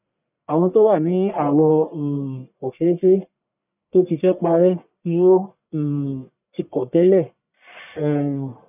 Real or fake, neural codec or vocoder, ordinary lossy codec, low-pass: fake; codec, 44.1 kHz, 1.7 kbps, Pupu-Codec; none; 3.6 kHz